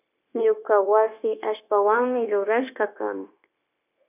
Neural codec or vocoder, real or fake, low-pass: codec, 16 kHz, 0.9 kbps, LongCat-Audio-Codec; fake; 3.6 kHz